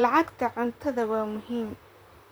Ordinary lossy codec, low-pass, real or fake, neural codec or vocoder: none; none; fake; vocoder, 44.1 kHz, 128 mel bands, Pupu-Vocoder